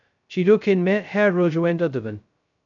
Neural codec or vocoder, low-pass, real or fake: codec, 16 kHz, 0.2 kbps, FocalCodec; 7.2 kHz; fake